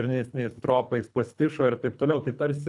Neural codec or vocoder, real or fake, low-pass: codec, 24 kHz, 3 kbps, HILCodec; fake; 10.8 kHz